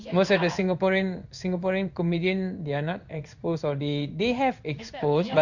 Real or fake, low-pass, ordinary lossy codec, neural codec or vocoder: fake; 7.2 kHz; none; codec, 16 kHz in and 24 kHz out, 1 kbps, XY-Tokenizer